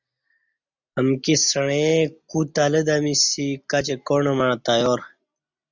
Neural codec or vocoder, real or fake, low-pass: none; real; 7.2 kHz